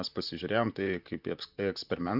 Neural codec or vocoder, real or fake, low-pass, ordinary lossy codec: vocoder, 44.1 kHz, 128 mel bands, Pupu-Vocoder; fake; 5.4 kHz; Opus, 64 kbps